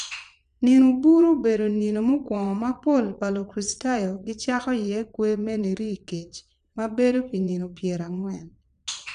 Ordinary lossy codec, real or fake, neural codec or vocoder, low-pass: none; fake; vocoder, 22.05 kHz, 80 mel bands, Vocos; 9.9 kHz